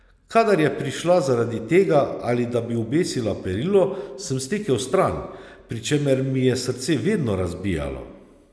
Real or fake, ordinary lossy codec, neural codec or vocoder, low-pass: real; none; none; none